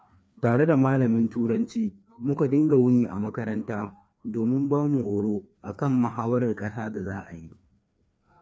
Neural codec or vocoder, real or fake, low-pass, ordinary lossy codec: codec, 16 kHz, 2 kbps, FreqCodec, larger model; fake; none; none